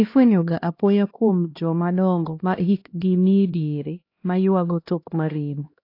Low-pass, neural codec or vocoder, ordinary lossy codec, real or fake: 5.4 kHz; codec, 16 kHz, 1 kbps, X-Codec, HuBERT features, trained on LibriSpeech; AAC, 32 kbps; fake